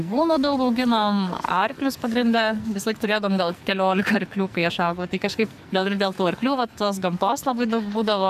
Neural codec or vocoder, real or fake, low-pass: codec, 44.1 kHz, 2.6 kbps, SNAC; fake; 14.4 kHz